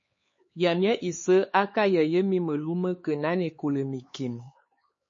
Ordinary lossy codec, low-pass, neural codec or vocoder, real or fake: MP3, 32 kbps; 7.2 kHz; codec, 16 kHz, 4 kbps, X-Codec, HuBERT features, trained on LibriSpeech; fake